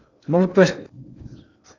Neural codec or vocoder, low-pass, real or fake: codec, 16 kHz in and 24 kHz out, 0.6 kbps, FocalCodec, streaming, 2048 codes; 7.2 kHz; fake